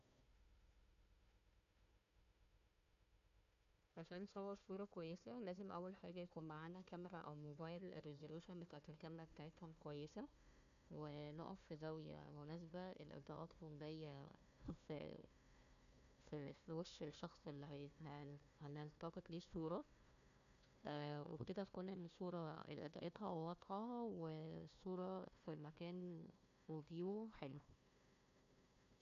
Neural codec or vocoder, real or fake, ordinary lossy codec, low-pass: codec, 16 kHz, 1 kbps, FunCodec, trained on Chinese and English, 50 frames a second; fake; none; 7.2 kHz